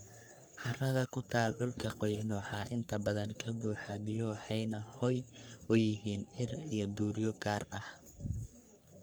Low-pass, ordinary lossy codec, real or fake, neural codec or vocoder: none; none; fake; codec, 44.1 kHz, 3.4 kbps, Pupu-Codec